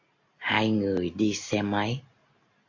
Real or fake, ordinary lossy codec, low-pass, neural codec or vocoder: real; MP3, 48 kbps; 7.2 kHz; none